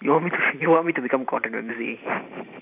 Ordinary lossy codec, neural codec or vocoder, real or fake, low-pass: none; vocoder, 44.1 kHz, 128 mel bands every 512 samples, BigVGAN v2; fake; 3.6 kHz